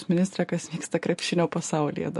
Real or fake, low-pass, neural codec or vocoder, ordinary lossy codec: real; 14.4 kHz; none; MP3, 48 kbps